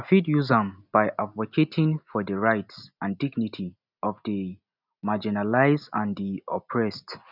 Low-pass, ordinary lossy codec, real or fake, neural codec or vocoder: 5.4 kHz; none; real; none